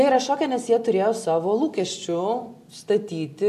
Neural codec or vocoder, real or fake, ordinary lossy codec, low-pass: none; real; AAC, 96 kbps; 14.4 kHz